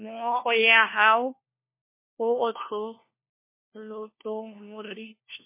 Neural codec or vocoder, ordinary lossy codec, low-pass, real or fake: codec, 16 kHz, 1 kbps, FunCodec, trained on LibriTTS, 50 frames a second; MP3, 24 kbps; 3.6 kHz; fake